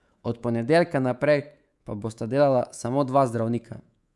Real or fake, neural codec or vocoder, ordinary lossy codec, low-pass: fake; codec, 24 kHz, 3.1 kbps, DualCodec; none; none